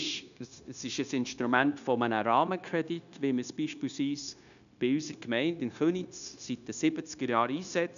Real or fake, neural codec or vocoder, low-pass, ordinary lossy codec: fake; codec, 16 kHz, 0.9 kbps, LongCat-Audio-Codec; 7.2 kHz; none